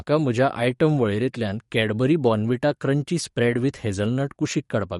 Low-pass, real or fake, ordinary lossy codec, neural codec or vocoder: 19.8 kHz; fake; MP3, 48 kbps; codec, 44.1 kHz, 7.8 kbps, DAC